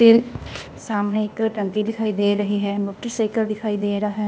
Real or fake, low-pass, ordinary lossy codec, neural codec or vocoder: fake; none; none; codec, 16 kHz, 0.8 kbps, ZipCodec